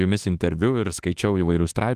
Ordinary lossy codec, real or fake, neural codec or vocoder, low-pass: Opus, 24 kbps; fake; autoencoder, 48 kHz, 32 numbers a frame, DAC-VAE, trained on Japanese speech; 14.4 kHz